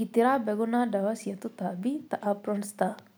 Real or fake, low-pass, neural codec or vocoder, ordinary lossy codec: real; none; none; none